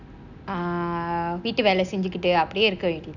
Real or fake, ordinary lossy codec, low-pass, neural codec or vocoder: real; none; 7.2 kHz; none